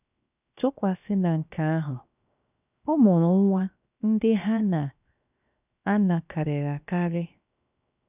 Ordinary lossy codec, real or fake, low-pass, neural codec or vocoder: none; fake; 3.6 kHz; codec, 16 kHz, 0.7 kbps, FocalCodec